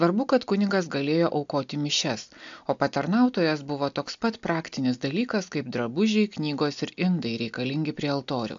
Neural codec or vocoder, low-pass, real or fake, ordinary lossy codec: none; 7.2 kHz; real; AAC, 64 kbps